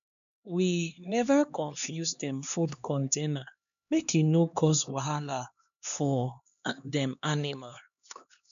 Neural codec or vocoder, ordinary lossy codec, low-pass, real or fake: codec, 16 kHz, 2 kbps, X-Codec, HuBERT features, trained on LibriSpeech; none; 7.2 kHz; fake